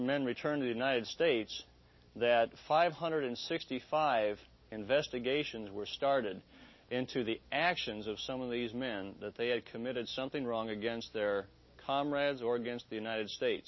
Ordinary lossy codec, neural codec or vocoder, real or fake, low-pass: MP3, 24 kbps; none; real; 7.2 kHz